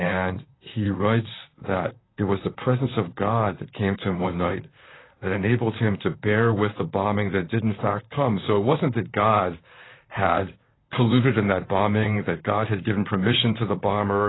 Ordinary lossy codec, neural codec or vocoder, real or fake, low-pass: AAC, 16 kbps; vocoder, 44.1 kHz, 128 mel bands, Pupu-Vocoder; fake; 7.2 kHz